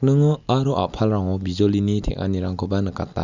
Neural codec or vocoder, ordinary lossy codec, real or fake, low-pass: none; none; real; 7.2 kHz